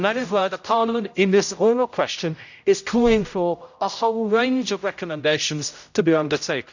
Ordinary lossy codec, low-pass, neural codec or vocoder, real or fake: none; 7.2 kHz; codec, 16 kHz, 0.5 kbps, X-Codec, HuBERT features, trained on general audio; fake